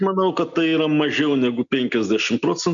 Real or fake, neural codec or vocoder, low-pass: real; none; 7.2 kHz